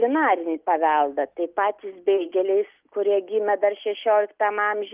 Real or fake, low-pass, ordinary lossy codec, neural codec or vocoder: real; 3.6 kHz; Opus, 32 kbps; none